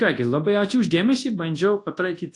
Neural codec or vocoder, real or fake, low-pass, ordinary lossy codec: codec, 24 kHz, 0.9 kbps, WavTokenizer, large speech release; fake; 10.8 kHz; AAC, 48 kbps